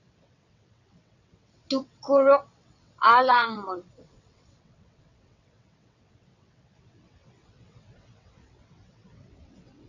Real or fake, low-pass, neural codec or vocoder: fake; 7.2 kHz; vocoder, 22.05 kHz, 80 mel bands, WaveNeXt